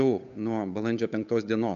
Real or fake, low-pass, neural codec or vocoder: fake; 7.2 kHz; codec, 16 kHz, 8 kbps, FunCodec, trained on Chinese and English, 25 frames a second